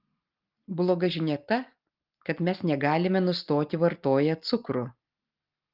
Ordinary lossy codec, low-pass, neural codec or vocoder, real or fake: Opus, 32 kbps; 5.4 kHz; none; real